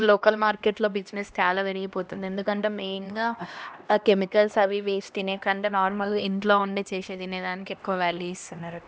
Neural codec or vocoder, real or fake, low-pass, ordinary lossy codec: codec, 16 kHz, 1 kbps, X-Codec, HuBERT features, trained on LibriSpeech; fake; none; none